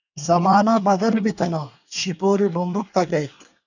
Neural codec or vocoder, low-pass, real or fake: codec, 24 kHz, 1 kbps, SNAC; 7.2 kHz; fake